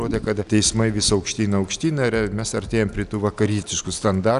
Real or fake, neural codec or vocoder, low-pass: real; none; 10.8 kHz